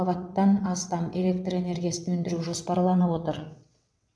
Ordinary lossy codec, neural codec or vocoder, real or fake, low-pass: none; vocoder, 22.05 kHz, 80 mel bands, WaveNeXt; fake; none